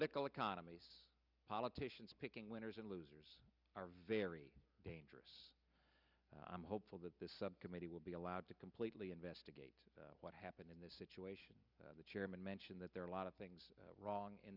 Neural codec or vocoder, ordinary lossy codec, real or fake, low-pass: none; Opus, 64 kbps; real; 5.4 kHz